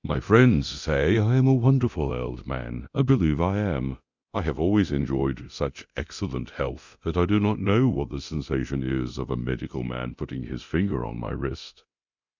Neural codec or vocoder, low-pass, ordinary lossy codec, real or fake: codec, 24 kHz, 0.9 kbps, DualCodec; 7.2 kHz; Opus, 64 kbps; fake